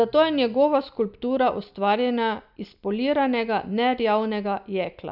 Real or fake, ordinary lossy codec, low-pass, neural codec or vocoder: real; none; 5.4 kHz; none